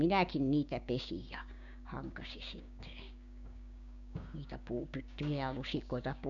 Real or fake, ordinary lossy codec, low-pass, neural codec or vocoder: fake; none; 7.2 kHz; codec, 16 kHz, 6 kbps, DAC